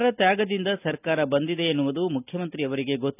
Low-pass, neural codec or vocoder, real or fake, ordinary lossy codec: 3.6 kHz; none; real; none